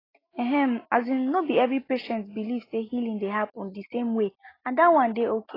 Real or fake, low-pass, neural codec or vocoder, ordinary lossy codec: real; 5.4 kHz; none; AAC, 24 kbps